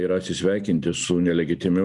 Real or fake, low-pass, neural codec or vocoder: real; 10.8 kHz; none